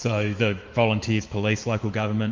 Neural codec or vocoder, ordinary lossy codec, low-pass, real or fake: autoencoder, 48 kHz, 128 numbers a frame, DAC-VAE, trained on Japanese speech; Opus, 32 kbps; 7.2 kHz; fake